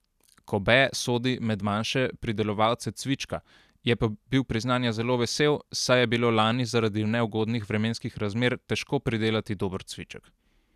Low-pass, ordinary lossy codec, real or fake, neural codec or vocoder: 14.4 kHz; none; real; none